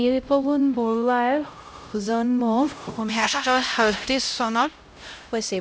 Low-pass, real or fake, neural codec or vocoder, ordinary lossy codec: none; fake; codec, 16 kHz, 0.5 kbps, X-Codec, HuBERT features, trained on LibriSpeech; none